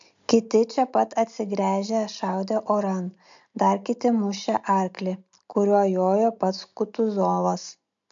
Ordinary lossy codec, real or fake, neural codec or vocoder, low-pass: MP3, 64 kbps; real; none; 7.2 kHz